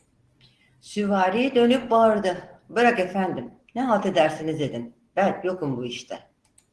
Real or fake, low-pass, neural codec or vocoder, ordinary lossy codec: real; 10.8 kHz; none; Opus, 16 kbps